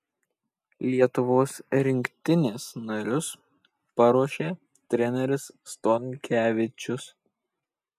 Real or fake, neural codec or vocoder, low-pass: real; none; 14.4 kHz